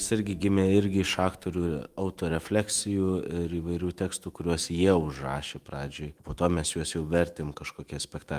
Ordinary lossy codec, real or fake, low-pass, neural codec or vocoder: Opus, 32 kbps; fake; 14.4 kHz; autoencoder, 48 kHz, 128 numbers a frame, DAC-VAE, trained on Japanese speech